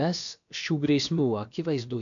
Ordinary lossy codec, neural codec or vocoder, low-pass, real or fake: MP3, 64 kbps; codec, 16 kHz, about 1 kbps, DyCAST, with the encoder's durations; 7.2 kHz; fake